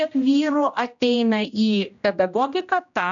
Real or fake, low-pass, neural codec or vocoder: fake; 7.2 kHz; codec, 16 kHz, 1 kbps, X-Codec, HuBERT features, trained on general audio